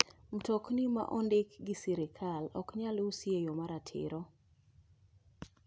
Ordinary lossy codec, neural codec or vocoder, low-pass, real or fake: none; none; none; real